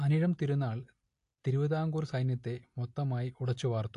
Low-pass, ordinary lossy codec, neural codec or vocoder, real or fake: 10.8 kHz; AAC, 48 kbps; none; real